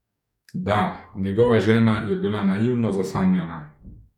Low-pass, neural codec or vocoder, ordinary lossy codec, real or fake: 19.8 kHz; codec, 44.1 kHz, 2.6 kbps, DAC; none; fake